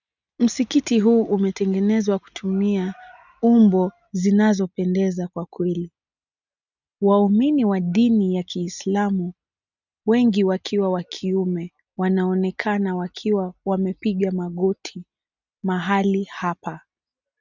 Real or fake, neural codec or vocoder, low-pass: real; none; 7.2 kHz